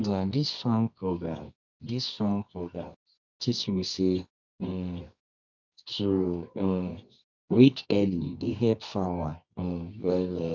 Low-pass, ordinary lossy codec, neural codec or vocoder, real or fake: 7.2 kHz; none; codec, 24 kHz, 0.9 kbps, WavTokenizer, medium music audio release; fake